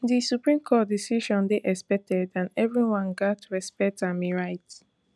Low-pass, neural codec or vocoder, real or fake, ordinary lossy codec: none; none; real; none